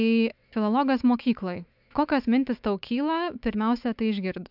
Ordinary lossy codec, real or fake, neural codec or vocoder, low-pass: AAC, 48 kbps; fake; autoencoder, 48 kHz, 128 numbers a frame, DAC-VAE, trained on Japanese speech; 5.4 kHz